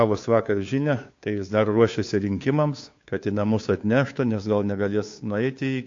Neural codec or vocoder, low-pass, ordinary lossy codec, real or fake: codec, 16 kHz, 2 kbps, FunCodec, trained on Chinese and English, 25 frames a second; 7.2 kHz; AAC, 48 kbps; fake